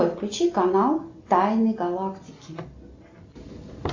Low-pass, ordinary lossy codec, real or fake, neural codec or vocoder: 7.2 kHz; AAC, 48 kbps; real; none